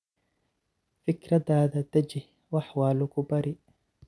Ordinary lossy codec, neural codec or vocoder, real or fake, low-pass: none; none; real; none